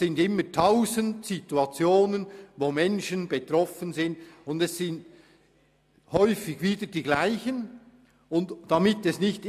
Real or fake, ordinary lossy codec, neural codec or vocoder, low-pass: real; MP3, 96 kbps; none; 14.4 kHz